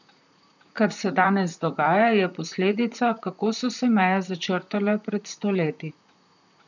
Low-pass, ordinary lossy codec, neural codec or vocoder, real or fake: 7.2 kHz; none; vocoder, 44.1 kHz, 128 mel bands, Pupu-Vocoder; fake